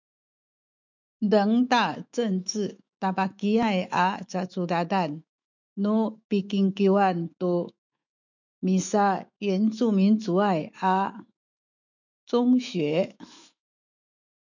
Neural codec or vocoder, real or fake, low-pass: autoencoder, 48 kHz, 128 numbers a frame, DAC-VAE, trained on Japanese speech; fake; 7.2 kHz